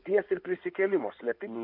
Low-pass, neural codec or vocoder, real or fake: 5.4 kHz; codec, 16 kHz in and 24 kHz out, 2.2 kbps, FireRedTTS-2 codec; fake